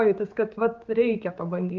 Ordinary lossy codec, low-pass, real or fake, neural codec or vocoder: Opus, 32 kbps; 7.2 kHz; fake; codec, 16 kHz, 4 kbps, X-Codec, HuBERT features, trained on general audio